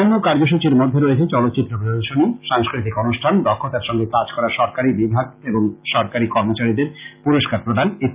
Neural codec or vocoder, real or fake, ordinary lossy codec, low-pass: none; real; Opus, 24 kbps; 3.6 kHz